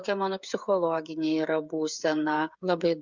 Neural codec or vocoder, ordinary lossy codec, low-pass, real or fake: codec, 16 kHz, 8 kbps, FreqCodec, smaller model; Opus, 64 kbps; 7.2 kHz; fake